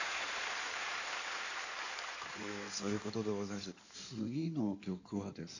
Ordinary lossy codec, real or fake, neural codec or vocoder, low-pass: none; fake; codec, 16 kHz in and 24 kHz out, 2.2 kbps, FireRedTTS-2 codec; 7.2 kHz